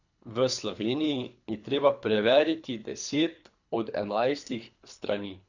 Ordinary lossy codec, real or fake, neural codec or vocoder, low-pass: none; fake; codec, 24 kHz, 3 kbps, HILCodec; 7.2 kHz